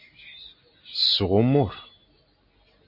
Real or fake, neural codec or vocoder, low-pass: real; none; 5.4 kHz